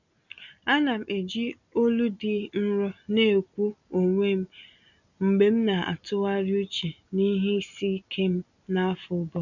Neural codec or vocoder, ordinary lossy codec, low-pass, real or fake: none; none; 7.2 kHz; real